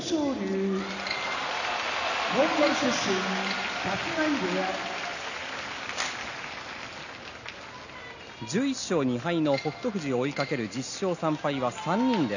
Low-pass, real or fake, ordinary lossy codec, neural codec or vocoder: 7.2 kHz; real; none; none